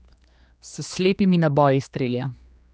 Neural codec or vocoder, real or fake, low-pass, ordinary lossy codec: codec, 16 kHz, 2 kbps, X-Codec, HuBERT features, trained on general audio; fake; none; none